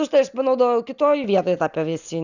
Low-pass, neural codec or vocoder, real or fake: 7.2 kHz; none; real